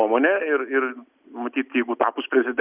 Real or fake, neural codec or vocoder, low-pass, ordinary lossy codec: real; none; 3.6 kHz; Opus, 64 kbps